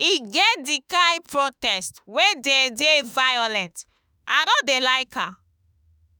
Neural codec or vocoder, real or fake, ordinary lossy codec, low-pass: autoencoder, 48 kHz, 32 numbers a frame, DAC-VAE, trained on Japanese speech; fake; none; none